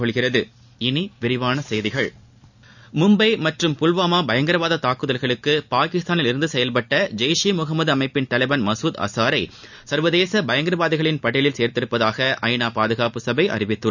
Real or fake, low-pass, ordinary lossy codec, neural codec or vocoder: real; 7.2 kHz; none; none